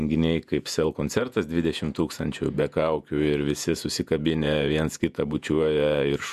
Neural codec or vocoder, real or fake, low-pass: vocoder, 48 kHz, 128 mel bands, Vocos; fake; 14.4 kHz